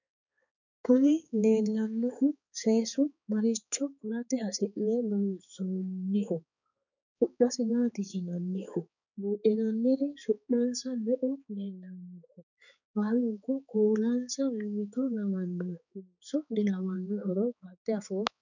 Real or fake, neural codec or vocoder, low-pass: fake; codec, 16 kHz, 4 kbps, X-Codec, HuBERT features, trained on balanced general audio; 7.2 kHz